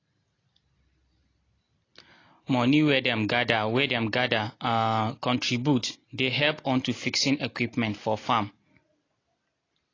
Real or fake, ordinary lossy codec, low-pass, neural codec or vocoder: real; AAC, 32 kbps; 7.2 kHz; none